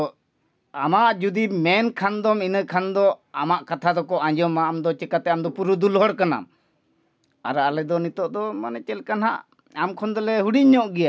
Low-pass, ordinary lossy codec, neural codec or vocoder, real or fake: none; none; none; real